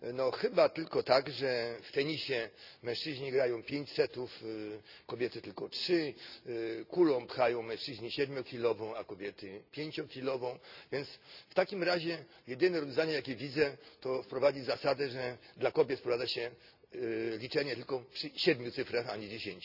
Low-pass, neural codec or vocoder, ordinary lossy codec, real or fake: 5.4 kHz; none; none; real